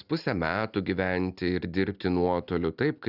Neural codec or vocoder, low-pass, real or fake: none; 5.4 kHz; real